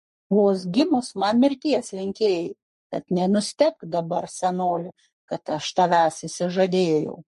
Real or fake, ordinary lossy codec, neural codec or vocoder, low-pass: fake; MP3, 48 kbps; codec, 44.1 kHz, 3.4 kbps, Pupu-Codec; 14.4 kHz